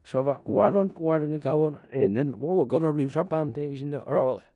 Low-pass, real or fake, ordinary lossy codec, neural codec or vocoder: 10.8 kHz; fake; none; codec, 16 kHz in and 24 kHz out, 0.4 kbps, LongCat-Audio-Codec, four codebook decoder